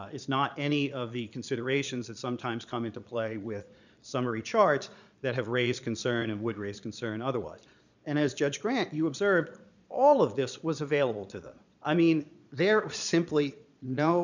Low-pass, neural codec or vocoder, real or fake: 7.2 kHz; vocoder, 22.05 kHz, 80 mel bands, Vocos; fake